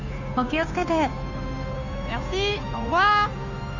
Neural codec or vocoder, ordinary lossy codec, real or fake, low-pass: codec, 16 kHz, 2 kbps, FunCodec, trained on Chinese and English, 25 frames a second; none; fake; 7.2 kHz